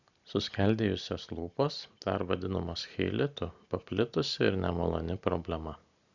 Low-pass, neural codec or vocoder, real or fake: 7.2 kHz; none; real